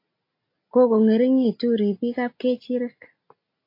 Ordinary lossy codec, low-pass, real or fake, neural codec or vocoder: AAC, 32 kbps; 5.4 kHz; real; none